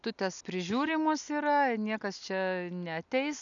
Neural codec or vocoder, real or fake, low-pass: none; real; 7.2 kHz